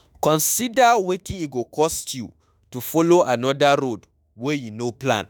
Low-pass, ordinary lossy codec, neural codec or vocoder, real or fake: none; none; autoencoder, 48 kHz, 32 numbers a frame, DAC-VAE, trained on Japanese speech; fake